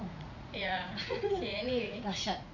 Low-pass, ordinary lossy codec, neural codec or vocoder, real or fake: 7.2 kHz; none; vocoder, 44.1 kHz, 80 mel bands, Vocos; fake